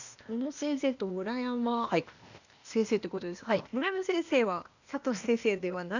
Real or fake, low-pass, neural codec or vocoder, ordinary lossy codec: fake; 7.2 kHz; codec, 16 kHz, 0.8 kbps, ZipCodec; none